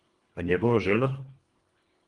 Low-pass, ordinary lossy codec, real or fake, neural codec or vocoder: 10.8 kHz; Opus, 24 kbps; fake; codec, 24 kHz, 1.5 kbps, HILCodec